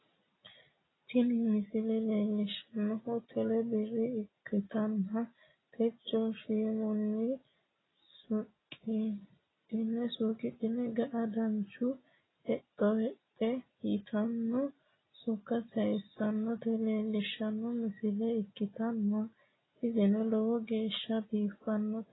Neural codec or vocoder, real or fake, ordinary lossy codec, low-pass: none; real; AAC, 16 kbps; 7.2 kHz